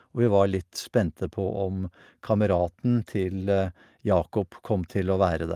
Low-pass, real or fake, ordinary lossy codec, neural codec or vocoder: 14.4 kHz; real; Opus, 32 kbps; none